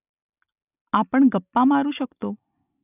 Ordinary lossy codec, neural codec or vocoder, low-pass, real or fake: none; none; 3.6 kHz; real